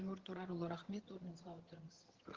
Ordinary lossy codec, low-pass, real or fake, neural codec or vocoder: Opus, 16 kbps; 7.2 kHz; fake; vocoder, 22.05 kHz, 80 mel bands, HiFi-GAN